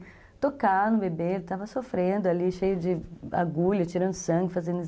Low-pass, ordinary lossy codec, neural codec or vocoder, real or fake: none; none; none; real